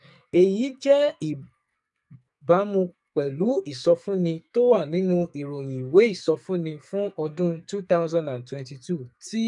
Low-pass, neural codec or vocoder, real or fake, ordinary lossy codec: 10.8 kHz; codec, 44.1 kHz, 2.6 kbps, SNAC; fake; none